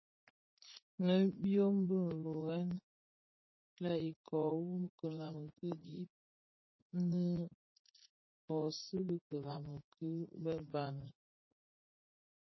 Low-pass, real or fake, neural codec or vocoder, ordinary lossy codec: 7.2 kHz; fake; vocoder, 44.1 kHz, 80 mel bands, Vocos; MP3, 24 kbps